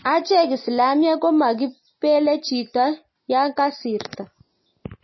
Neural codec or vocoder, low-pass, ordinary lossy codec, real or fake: none; 7.2 kHz; MP3, 24 kbps; real